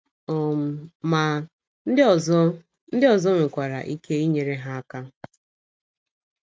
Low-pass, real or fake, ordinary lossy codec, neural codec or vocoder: none; real; none; none